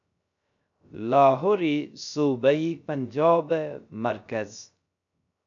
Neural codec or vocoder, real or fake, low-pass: codec, 16 kHz, 0.3 kbps, FocalCodec; fake; 7.2 kHz